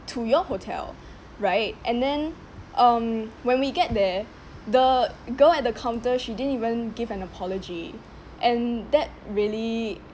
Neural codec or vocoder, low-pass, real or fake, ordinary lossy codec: none; none; real; none